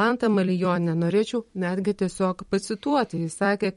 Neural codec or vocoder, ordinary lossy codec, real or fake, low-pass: vocoder, 44.1 kHz, 128 mel bands every 256 samples, BigVGAN v2; MP3, 48 kbps; fake; 19.8 kHz